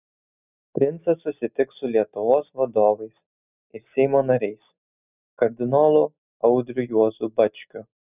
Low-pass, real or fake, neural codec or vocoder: 3.6 kHz; real; none